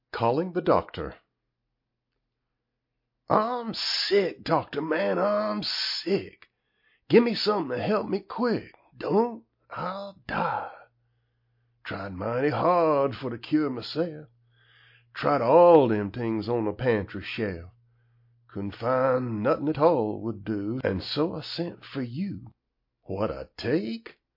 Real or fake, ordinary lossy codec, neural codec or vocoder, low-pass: real; MP3, 32 kbps; none; 5.4 kHz